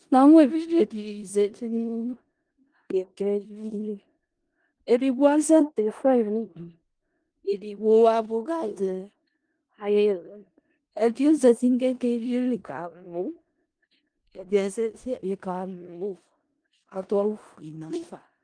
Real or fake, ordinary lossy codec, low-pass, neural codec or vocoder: fake; Opus, 24 kbps; 9.9 kHz; codec, 16 kHz in and 24 kHz out, 0.4 kbps, LongCat-Audio-Codec, four codebook decoder